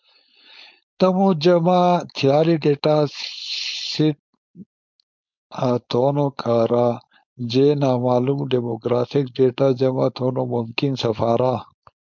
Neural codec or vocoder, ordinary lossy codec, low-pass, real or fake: codec, 16 kHz, 4.8 kbps, FACodec; MP3, 64 kbps; 7.2 kHz; fake